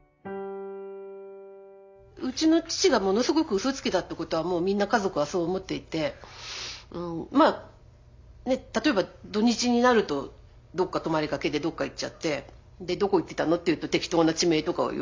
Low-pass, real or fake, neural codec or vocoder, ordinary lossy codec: 7.2 kHz; real; none; MP3, 48 kbps